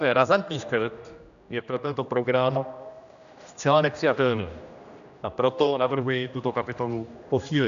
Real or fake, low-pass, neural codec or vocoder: fake; 7.2 kHz; codec, 16 kHz, 1 kbps, X-Codec, HuBERT features, trained on general audio